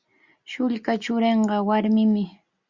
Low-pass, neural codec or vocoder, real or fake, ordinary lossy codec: 7.2 kHz; none; real; Opus, 64 kbps